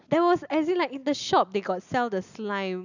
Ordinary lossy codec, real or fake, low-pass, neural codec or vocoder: none; real; 7.2 kHz; none